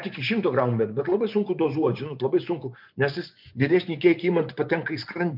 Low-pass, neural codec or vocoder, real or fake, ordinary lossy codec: 5.4 kHz; vocoder, 24 kHz, 100 mel bands, Vocos; fake; MP3, 48 kbps